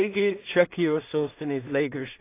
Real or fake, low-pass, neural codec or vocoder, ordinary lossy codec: fake; 3.6 kHz; codec, 16 kHz in and 24 kHz out, 0.4 kbps, LongCat-Audio-Codec, two codebook decoder; AAC, 24 kbps